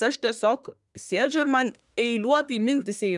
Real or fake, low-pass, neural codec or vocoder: fake; 10.8 kHz; codec, 24 kHz, 1 kbps, SNAC